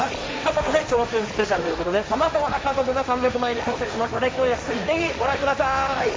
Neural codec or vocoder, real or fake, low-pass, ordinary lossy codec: codec, 16 kHz, 1.1 kbps, Voila-Tokenizer; fake; none; none